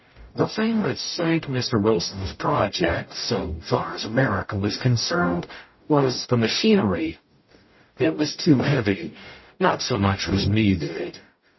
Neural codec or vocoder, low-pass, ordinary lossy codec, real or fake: codec, 44.1 kHz, 0.9 kbps, DAC; 7.2 kHz; MP3, 24 kbps; fake